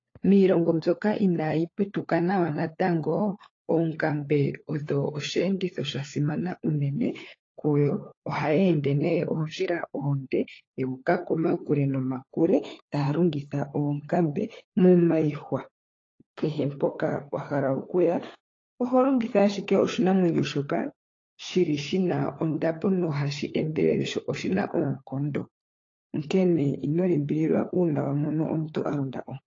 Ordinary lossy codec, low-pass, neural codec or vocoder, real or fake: AAC, 32 kbps; 7.2 kHz; codec, 16 kHz, 4 kbps, FunCodec, trained on LibriTTS, 50 frames a second; fake